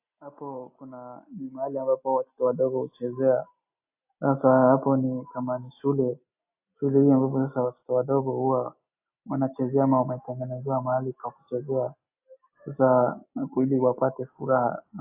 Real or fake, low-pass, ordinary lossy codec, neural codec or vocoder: real; 3.6 kHz; AAC, 32 kbps; none